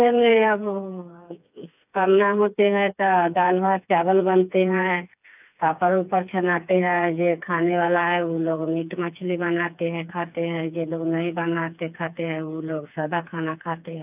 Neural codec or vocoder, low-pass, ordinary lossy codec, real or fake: codec, 16 kHz, 4 kbps, FreqCodec, smaller model; 3.6 kHz; none; fake